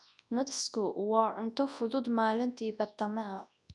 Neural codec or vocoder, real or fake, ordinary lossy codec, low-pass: codec, 24 kHz, 0.9 kbps, WavTokenizer, large speech release; fake; none; 10.8 kHz